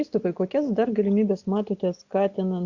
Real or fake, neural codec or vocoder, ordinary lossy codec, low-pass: real; none; Opus, 64 kbps; 7.2 kHz